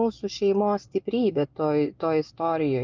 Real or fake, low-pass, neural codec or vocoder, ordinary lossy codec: real; 7.2 kHz; none; Opus, 32 kbps